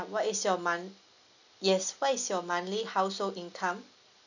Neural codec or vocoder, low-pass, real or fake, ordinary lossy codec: none; 7.2 kHz; real; none